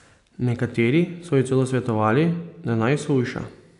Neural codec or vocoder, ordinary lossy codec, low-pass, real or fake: none; none; 10.8 kHz; real